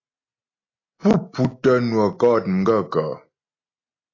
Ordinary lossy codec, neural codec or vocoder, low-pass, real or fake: AAC, 32 kbps; none; 7.2 kHz; real